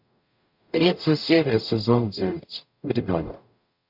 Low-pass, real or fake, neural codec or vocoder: 5.4 kHz; fake; codec, 44.1 kHz, 0.9 kbps, DAC